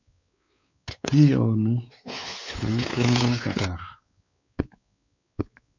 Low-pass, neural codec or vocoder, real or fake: 7.2 kHz; codec, 16 kHz, 4 kbps, X-Codec, WavLM features, trained on Multilingual LibriSpeech; fake